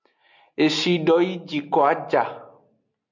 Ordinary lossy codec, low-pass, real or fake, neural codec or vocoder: MP3, 64 kbps; 7.2 kHz; real; none